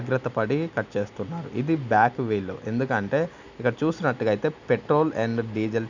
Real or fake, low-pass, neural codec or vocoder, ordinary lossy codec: real; 7.2 kHz; none; none